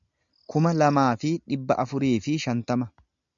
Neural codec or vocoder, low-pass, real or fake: none; 7.2 kHz; real